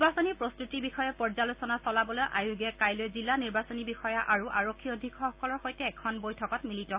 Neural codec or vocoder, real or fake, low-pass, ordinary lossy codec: none; real; 3.6 kHz; Opus, 64 kbps